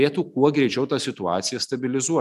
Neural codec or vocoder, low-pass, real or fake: none; 14.4 kHz; real